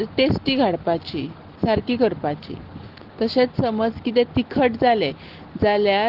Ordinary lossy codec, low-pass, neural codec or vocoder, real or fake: Opus, 16 kbps; 5.4 kHz; none; real